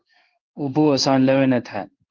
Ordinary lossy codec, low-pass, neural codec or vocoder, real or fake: Opus, 24 kbps; 7.2 kHz; codec, 16 kHz in and 24 kHz out, 1 kbps, XY-Tokenizer; fake